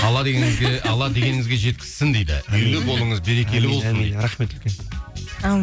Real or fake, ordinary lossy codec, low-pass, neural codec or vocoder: real; none; none; none